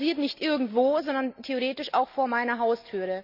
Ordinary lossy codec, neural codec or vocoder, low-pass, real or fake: none; none; 5.4 kHz; real